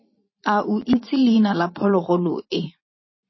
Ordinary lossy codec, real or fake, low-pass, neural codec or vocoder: MP3, 24 kbps; fake; 7.2 kHz; vocoder, 44.1 kHz, 128 mel bands every 512 samples, BigVGAN v2